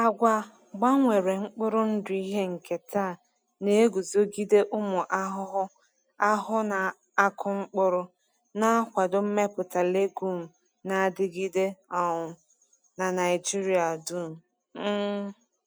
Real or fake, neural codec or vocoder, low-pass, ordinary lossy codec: real; none; none; none